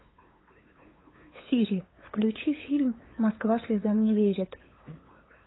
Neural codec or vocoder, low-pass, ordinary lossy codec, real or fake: codec, 16 kHz, 8 kbps, FunCodec, trained on LibriTTS, 25 frames a second; 7.2 kHz; AAC, 16 kbps; fake